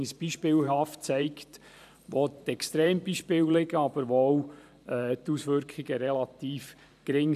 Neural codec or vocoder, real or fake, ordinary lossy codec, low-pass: vocoder, 44.1 kHz, 128 mel bands every 512 samples, BigVGAN v2; fake; none; 14.4 kHz